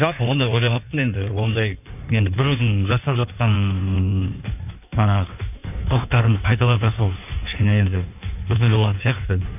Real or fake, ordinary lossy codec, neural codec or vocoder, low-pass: fake; none; codec, 16 kHz in and 24 kHz out, 1.1 kbps, FireRedTTS-2 codec; 3.6 kHz